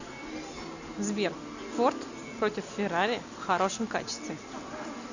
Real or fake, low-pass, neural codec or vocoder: real; 7.2 kHz; none